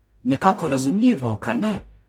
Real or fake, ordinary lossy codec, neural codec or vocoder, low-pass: fake; none; codec, 44.1 kHz, 0.9 kbps, DAC; 19.8 kHz